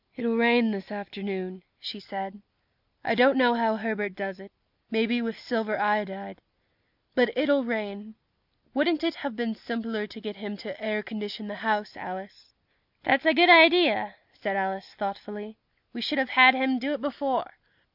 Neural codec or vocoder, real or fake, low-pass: none; real; 5.4 kHz